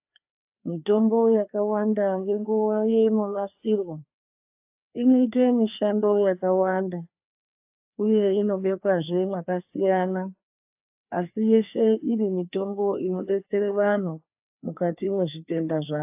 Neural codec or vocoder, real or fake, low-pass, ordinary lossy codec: codec, 16 kHz, 2 kbps, FreqCodec, larger model; fake; 3.6 kHz; AAC, 32 kbps